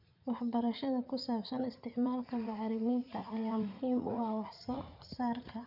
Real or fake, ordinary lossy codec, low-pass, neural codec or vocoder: fake; none; 5.4 kHz; vocoder, 44.1 kHz, 80 mel bands, Vocos